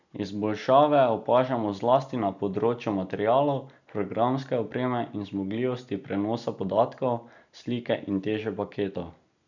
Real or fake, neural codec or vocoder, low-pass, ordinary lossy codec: real; none; 7.2 kHz; none